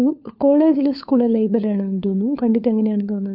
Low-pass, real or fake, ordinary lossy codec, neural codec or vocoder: 5.4 kHz; fake; none; codec, 16 kHz, 4 kbps, FunCodec, trained on LibriTTS, 50 frames a second